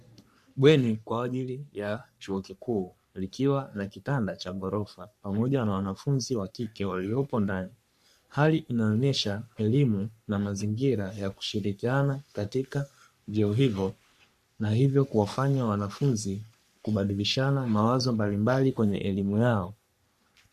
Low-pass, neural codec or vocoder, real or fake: 14.4 kHz; codec, 44.1 kHz, 3.4 kbps, Pupu-Codec; fake